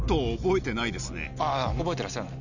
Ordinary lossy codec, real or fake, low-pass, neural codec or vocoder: none; real; 7.2 kHz; none